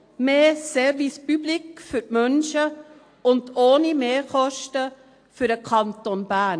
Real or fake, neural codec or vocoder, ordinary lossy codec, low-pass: real; none; AAC, 48 kbps; 9.9 kHz